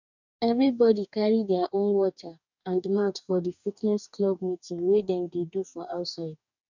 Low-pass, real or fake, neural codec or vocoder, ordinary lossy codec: 7.2 kHz; fake; codec, 44.1 kHz, 2.6 kbps, DAC; none